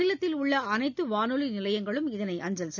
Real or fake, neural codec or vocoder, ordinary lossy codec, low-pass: real; none; none; 7.2 kHz